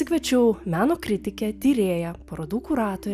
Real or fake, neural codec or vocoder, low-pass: real; none; 14.4 kHz